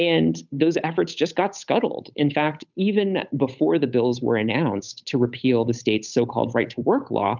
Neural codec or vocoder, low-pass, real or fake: codec, 16 kHz, 8 kbps, FunCodec, trained on Chinese and English, 25 frames a second; 7.2 kHz; fake